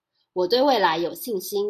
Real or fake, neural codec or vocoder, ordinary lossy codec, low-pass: real; none; AAC, 96 kbps; 14.4 kHz